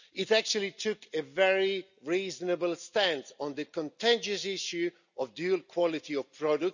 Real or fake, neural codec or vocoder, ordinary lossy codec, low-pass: real; none; none; 7.2 kHz